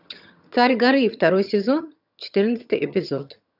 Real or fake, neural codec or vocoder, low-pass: fake; vocoder, 22.05 kHz, 80 mel bands, HiFi-GAN; 5.4 kHz